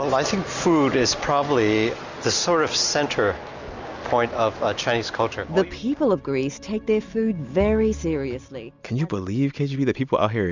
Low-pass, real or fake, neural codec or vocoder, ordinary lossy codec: 7.2 kHz; real; none; Opus, 64 kbps